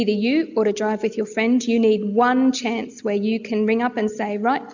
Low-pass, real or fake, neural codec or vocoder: 7.2 kHz; real; none